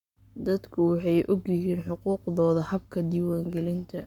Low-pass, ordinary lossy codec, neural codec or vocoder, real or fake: 19.8 kHz; none; codec, 44.1 kHz, 7.8 kbps, Pupu-Codec; fake